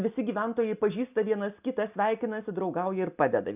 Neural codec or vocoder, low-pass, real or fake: none; 3.6 kHz; real